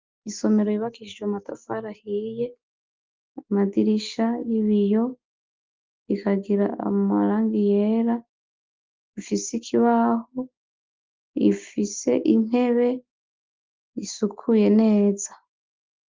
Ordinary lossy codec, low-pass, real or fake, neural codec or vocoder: Opus, 32 kbps; 7.2 kHz; real; none